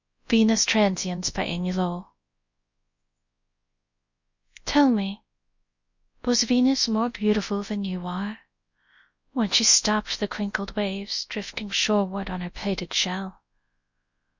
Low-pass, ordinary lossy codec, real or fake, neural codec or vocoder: 7.2 kHz; Opus, 64 kbps; fake; codec, 24 kHz, 0.9 kbps, WavTokenizer, large speech release